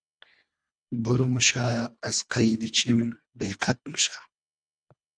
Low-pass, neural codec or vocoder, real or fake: 9.9 kHz; codec, 24 kHz, 1.5 kbps, HILCodec; fake